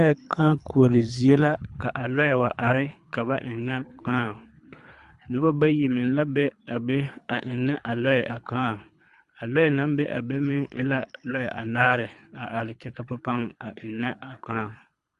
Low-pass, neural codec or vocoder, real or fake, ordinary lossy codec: 10.8 kHz; codec, 24 kHz, 3 kbps, HILCodec; fake; Opus, 64 kbps